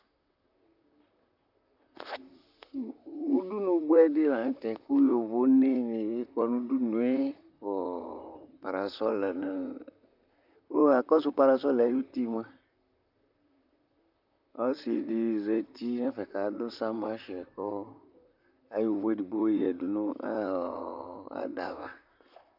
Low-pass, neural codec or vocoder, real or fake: 5.4 kHz; vocoder, 44.1 kHz, 128 mel bands, Pupu-Vocoder; fake